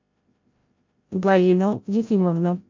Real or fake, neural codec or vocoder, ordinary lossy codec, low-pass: fake; codec, 16 kHz, 0.5 kbps, FreqCodec, larger model; MP3, 48 kbps; 7.2 kHz